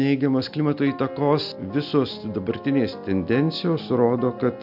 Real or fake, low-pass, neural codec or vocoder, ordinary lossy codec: real; 5.4 kHz; none; AAC, 48 kbps